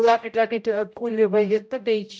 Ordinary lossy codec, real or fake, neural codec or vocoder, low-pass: none; fake; codec, 16 kHz, 0.5 kbps, X-Codec, HuBERT features, trained on general audio; none